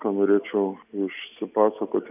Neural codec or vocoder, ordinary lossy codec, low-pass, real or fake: none; AAC, 32 kbps; 3.6 kHz; real